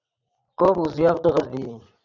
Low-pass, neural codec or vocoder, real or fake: 7.2 kHz; vocoder, 44.1 kHz, 80 mel bands, Vocos; fake